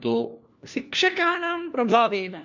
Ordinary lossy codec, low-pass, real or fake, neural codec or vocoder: none; 7.2 kHz; fake; codec, 16 kHz, 1 kbps, FunCodec, trained on LibriTTS, 50 frames a second